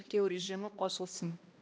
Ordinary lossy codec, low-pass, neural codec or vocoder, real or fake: none; none; codec, 16 kHz, 1 kbps, X-Codec, HuBERT features, trained on balanced general audio; fake